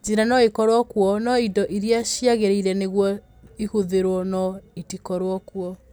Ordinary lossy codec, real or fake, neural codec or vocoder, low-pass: none; real; none; none